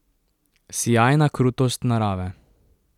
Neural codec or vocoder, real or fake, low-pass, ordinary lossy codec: none; real; 19.8 kHz; none